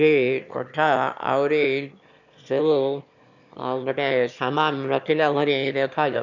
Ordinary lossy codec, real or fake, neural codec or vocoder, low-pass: none; fake; autoencoder, 22.05 kHz, a latent of 192 numbers a frame, VITS, trained on one speaker; 7.2 kHz